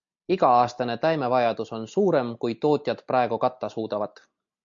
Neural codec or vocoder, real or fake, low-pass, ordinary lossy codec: none; real; 7.2 kHz; MP3, 48 kbps